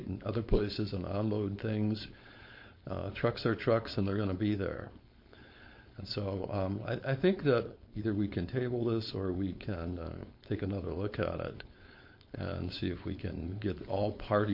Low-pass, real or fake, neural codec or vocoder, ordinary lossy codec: 5.4 kHz; fake; codec, 16 kHz, 4.8 kbps, FACodec; MP3, 32 kbps